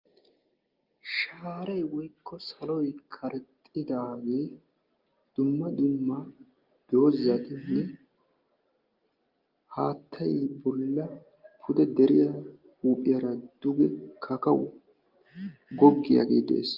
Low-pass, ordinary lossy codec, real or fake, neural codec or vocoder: 5.4 kHz; Opus, 32 kbps; real; none